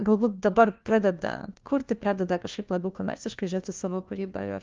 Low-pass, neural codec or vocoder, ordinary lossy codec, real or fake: 7.2 kHz; codec, 16 kHz, 1 kbps, FunCodec, trained on LibriTTS, 50 frames a second; Opus, 32 kbps; fake